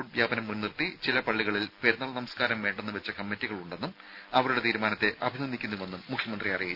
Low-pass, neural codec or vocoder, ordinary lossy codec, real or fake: 5.4 kHz; none; none; real